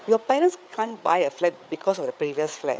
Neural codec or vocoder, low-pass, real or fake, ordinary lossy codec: codec, 16 kHz, 8 kbps, FreqCodec, larger model; none; fake; none